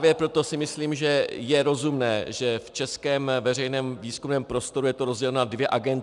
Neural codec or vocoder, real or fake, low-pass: none; real; 10.8 kHz